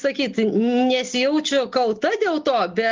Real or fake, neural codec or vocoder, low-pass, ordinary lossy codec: real; none; 7.2 kHz; Opus, 32 kbps